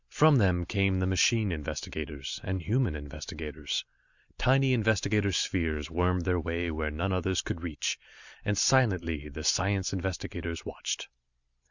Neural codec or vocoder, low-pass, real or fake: none; 7.2 kHz; real